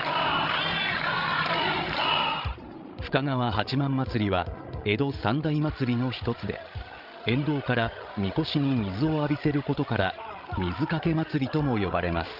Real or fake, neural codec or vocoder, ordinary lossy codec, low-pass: fake; codec, 16 kHz, 16 kbps, FreqCodec, larger model; Opus, 24 kbps; 5.4 kHz